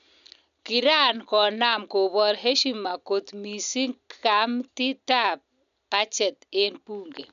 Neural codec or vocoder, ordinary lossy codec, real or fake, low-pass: none; none; real; 7.2 kHz